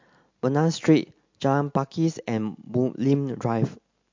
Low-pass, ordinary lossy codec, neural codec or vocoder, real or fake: 7.2 kHz; MP3, 48 kbps; none; real